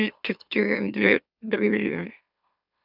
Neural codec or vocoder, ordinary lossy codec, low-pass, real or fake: autoencoder, 44.1 kHz, a latent of 192 numbers a frame, MeloTTS; none; 5.4 kHz; fake